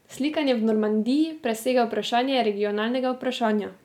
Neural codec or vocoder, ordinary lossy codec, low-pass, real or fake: none; none; 19.8 kHz; real